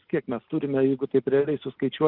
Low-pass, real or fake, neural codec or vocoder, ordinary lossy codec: 5.4 kHz; real; none; Opus, 24 kbps